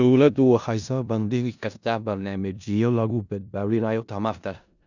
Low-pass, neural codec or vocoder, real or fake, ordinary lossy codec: 7.2 kHz; codec, 16 kHz in and 24 kHz out, 0.4 kbps, LongCat-Audio-Codec, four codebook decoder; fake; none